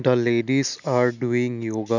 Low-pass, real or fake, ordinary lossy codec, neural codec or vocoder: 7.2 kHz; real; none; none